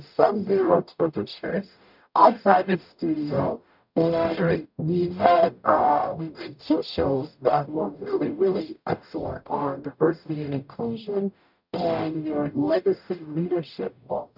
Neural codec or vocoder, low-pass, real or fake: codec, 44.1 kHz, 0.9 kbps, DAC; 5.4 kHz; fake